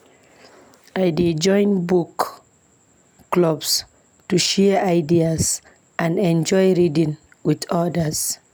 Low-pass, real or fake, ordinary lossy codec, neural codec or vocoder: none; real; none; none